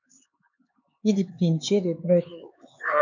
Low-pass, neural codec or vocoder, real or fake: 7.2 kHz; codec, 16 kHz, 4 kbps, X-Codec, HuBERT features, trained on LibriSpeech; fake